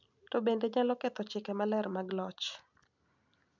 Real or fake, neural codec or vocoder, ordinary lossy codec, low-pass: real; none; none; 7.2 kHz